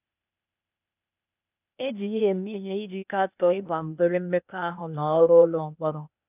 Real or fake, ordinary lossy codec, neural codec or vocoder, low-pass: fake; none; codec, 16 kHz, 0.8 kbps, ZipCodec; 3.6 kHz